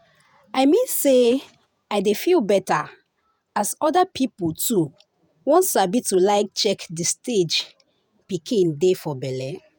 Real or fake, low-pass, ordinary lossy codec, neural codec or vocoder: fake; none; none; vocoder, 48 kHz, 128 mel bands, Vocos